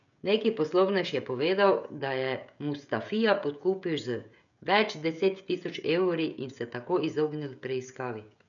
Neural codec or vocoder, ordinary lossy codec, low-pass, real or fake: codec, 16 kHz, 16 kbps, FreqCodec, smaller model; none; 7.2 kHz; fake